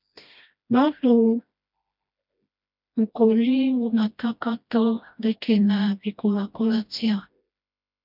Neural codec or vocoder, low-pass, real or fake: codec, 16 kHz, 1 kbps, FreqCodec, smaller model; 5.4 kHz; fake